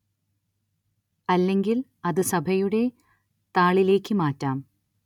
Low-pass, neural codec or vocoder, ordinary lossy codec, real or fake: 19.8 kHz; none; none; real